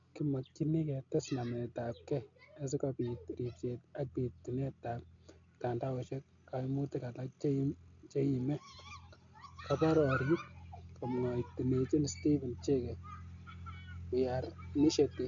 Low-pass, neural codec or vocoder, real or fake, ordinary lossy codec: 7.2 kHz; none; real; AAC, 64 kbps